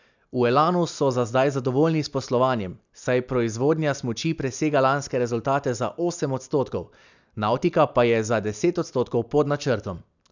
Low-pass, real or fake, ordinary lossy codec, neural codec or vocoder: 7.2 kHz; real; none; none